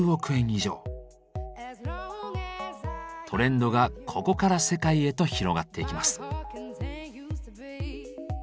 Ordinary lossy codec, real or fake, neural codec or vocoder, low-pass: none; real; none; none